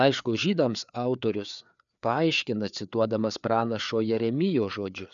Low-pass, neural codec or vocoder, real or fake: 7.2 kHz; codec, 16 kHz, 4 kbps, FreqCodec, larger model; fake